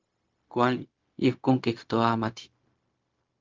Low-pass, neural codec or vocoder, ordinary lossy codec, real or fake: 7.2 kHz; codec, 16 kHz, 0.4 kbps, LongCat-Audio-Codec; Opus, 32 kbps; fake